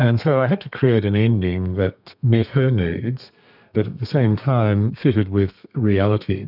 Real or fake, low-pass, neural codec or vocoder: fake; 5.4 kHz; codec, 32 kHz, 1.9 kbps, SNAC